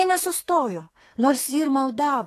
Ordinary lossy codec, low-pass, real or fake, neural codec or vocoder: AAC, 48 kbps; 14.4 kHz; fake; codec, 32 kHz, 1.9 kbps, SNAC